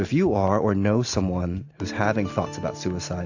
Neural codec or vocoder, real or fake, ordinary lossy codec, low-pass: none; real; AAC, 48 kbps; 7.2 kHz